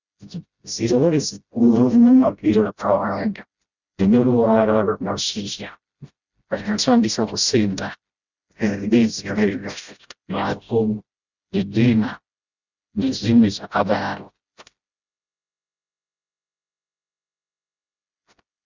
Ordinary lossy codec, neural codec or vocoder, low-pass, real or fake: Opus, 64 kbps; codec, 16 kHz, 0.5 kbps, FreqCodec, smaller model; 7.2 kHz; fake